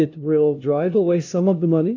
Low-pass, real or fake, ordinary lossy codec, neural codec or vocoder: 7.2 kHz; fake; AAC, 48 kbps; codec, 16 kHz, 0.5 kbps, FunCodec, trained on LibriTTS, 25 frames a second